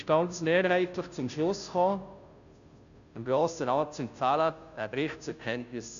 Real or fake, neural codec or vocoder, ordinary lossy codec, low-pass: fake; codec, 16 kHz, 0.5 kbps, FunCodec, trained on Chinese and English, 25 frames a second; none; 7.2 kHz